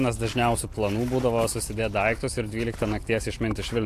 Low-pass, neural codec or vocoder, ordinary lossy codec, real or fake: 14.4 kHz; none; AAC, 64 kbps; real